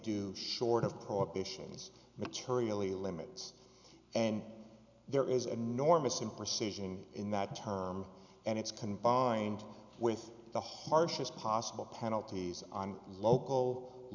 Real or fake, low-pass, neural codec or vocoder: real; 7.2 kHz; none